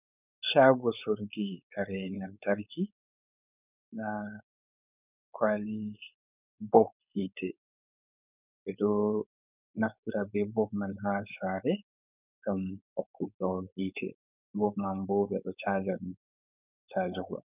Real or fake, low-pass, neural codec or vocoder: fake; 3.6 kHz; codec, 16 kHz, 4.8 kbps, FACodec